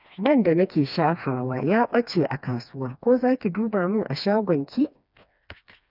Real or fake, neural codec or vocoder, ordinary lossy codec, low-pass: fake; codec, 16 kHz, 2 kbps, FreqCodec, smaller model; none; 5.4 kHz